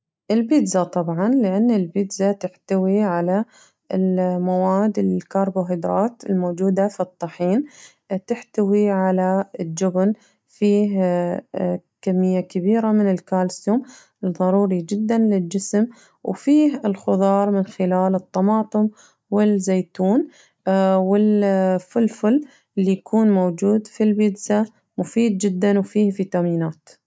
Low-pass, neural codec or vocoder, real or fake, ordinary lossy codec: none; none; real; none